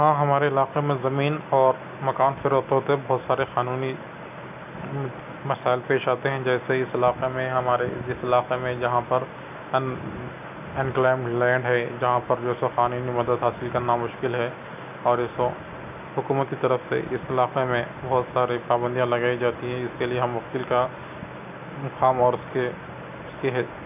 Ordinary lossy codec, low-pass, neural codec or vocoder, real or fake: none; 3.6 kHz; none; real